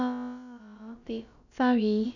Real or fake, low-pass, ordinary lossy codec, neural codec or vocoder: fake; 7.2 kHz; none; codec, 16 kHz, about 1 kbps, DyCAST, with the encoder's durations